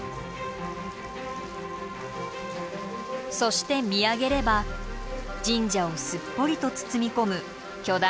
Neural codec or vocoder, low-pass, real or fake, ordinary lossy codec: none; none; real; none